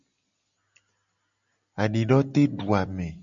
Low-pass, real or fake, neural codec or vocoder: 7.2 kHz; real; none